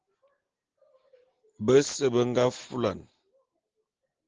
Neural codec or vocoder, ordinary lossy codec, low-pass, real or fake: none; Opus, 16 kbps; 7.2 kHz; real